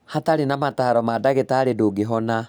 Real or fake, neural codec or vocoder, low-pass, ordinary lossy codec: real; none; none; none